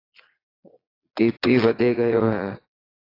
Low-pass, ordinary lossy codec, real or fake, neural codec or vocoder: 5.4 kHz; AAC, 24 kbps; fake; vocoder, 22.05 kHz, 80 mel bands, Vocos